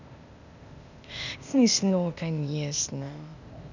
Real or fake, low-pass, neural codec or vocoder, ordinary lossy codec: fake; 7.2 kHz; codec, 16 kHz, 0.8 kbps, ZipCodec; none